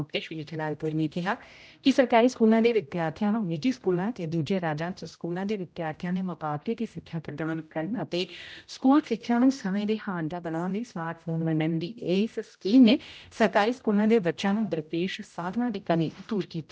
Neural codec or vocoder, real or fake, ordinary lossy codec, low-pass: codec, 16 kHz, 0.5 kbps, X-Codec, HuBERT features, trained on general audio; fake; none; none